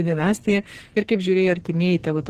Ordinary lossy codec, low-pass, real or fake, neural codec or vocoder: Opus, 16 kbps; 14.4 kHz; fake; codec, 44.1 kHz, 2.6 kbps, SNAC